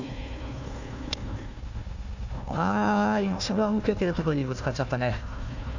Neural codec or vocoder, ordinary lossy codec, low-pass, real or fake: codec, 16 kHz, 1 kbps, FunCodec, trained on Chinese and English, 50 frames a second; none; 7.2 kHz; fake